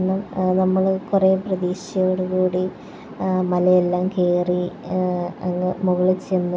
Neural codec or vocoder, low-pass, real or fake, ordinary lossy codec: none; none; real; none